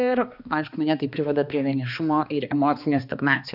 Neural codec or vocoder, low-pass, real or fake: codec, 16 kHz, 2 kbps, X-Codec, HuBERT features, trained on balanced general audio; 5.4 kHz; fake